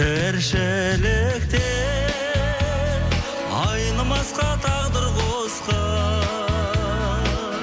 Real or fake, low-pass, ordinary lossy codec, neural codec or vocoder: real; none; none; none